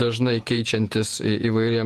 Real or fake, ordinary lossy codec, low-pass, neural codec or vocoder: fake; Opus, 24 kbps; 14.4 kHz; vocoder, 48 kHz, 128 mel bands, Vocos